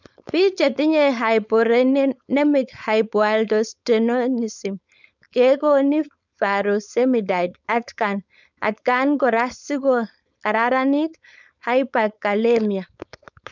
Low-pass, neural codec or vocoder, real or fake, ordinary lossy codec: 7.2 kHz; codec, 16 kHz, 4.8 kbps, FACodec; fake; none